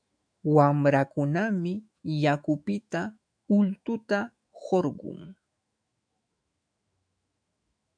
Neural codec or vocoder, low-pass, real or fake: autoencoder, 48 kHz, 128 numbers a frame, DAC-VAE, trained on Japanese speech; 9.9 kHz; fake